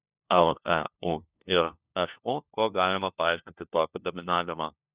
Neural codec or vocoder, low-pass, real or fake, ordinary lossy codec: codec, 16 kHz, 1 kbps, FunCodec, trained on LibriTTS, 50 frames a second; 3.6 kHz; fake; Opus, 64 kbps